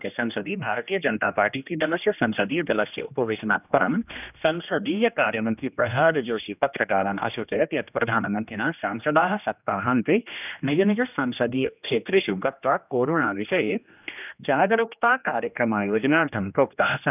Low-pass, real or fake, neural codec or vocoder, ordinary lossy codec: 3.6 kHz; fake; codec, 16 kHz, 1 kbps, X-Codec, HuBERT features, trained on general audio; none